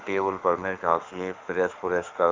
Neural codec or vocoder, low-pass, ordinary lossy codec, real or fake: autoencoder, 48 kHz, 32 numbers a frame, DAC-VAE, trained on Japanese speech; 7.2 kHz; Opus, 24 kbps; fake